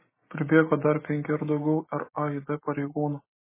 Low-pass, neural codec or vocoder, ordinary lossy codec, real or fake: 3.6 kHz; none; MP3, 16 kbps; real